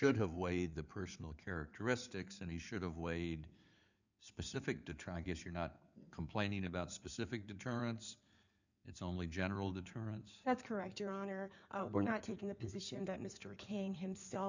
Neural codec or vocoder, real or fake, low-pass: codec, 16 kHz in and 24 kHz out, 2.2 kbps, FireRedTTS-2 codec; fake; 7.2 kHz